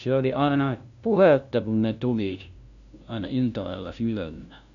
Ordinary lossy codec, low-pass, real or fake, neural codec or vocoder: none; 7.2 kHz; fake; codec, 16 kHz, 0.5 kbps, FunCodec, trained on LibriTTS, 25 frames a second